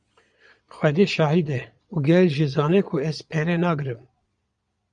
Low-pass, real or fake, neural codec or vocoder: 9.9 kHz; fake; vocoder, 22.05 kHz, 80 mel bands, Vocos